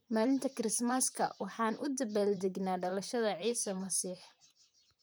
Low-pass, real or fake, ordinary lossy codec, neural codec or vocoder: none; fake; none; vocoder, 44.1 kHz, 128 mel bands, Pupu-Vocoder